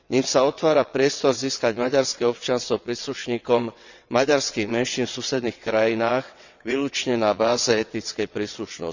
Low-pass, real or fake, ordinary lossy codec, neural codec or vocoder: 7.2 kHz; fake; none; vocoder, 22.05 kHz, 80 mel bands, WaveNeXt